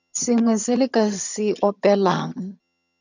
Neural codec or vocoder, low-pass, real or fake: vocoder, 22.05 kHz, 80 mel bands, HiFi-GAN; 7.2 kHz; fake